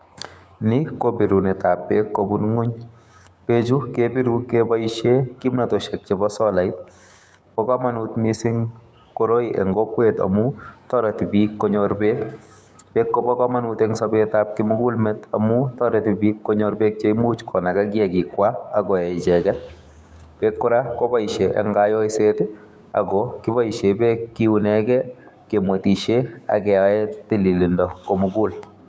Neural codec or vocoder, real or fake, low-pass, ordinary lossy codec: codec, 16 kHz, 6 kbps, DAC; fake; none; none